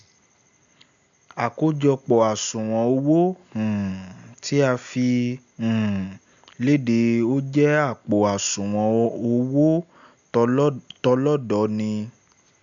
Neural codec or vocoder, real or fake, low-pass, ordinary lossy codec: none; real; 7.2 kHz; none